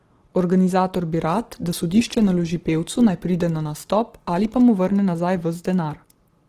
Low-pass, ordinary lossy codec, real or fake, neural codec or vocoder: 14.4 kHz; Opus, 16 kbps; real; none